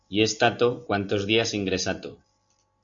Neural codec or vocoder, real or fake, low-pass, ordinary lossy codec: none; real; 7.2 kHz; MP3, 64 kbps